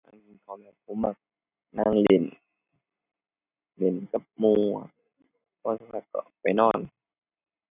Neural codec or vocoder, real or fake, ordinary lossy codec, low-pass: none; real; none; 3.6 kHz